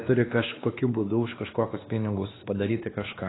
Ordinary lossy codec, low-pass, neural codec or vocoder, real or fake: AAC, 16 kbps; 7.2 kHz; codec, 16 kHz, 2 kbps, X-Codec, WavLM features, trained on Multilingual LibriSpeech; fake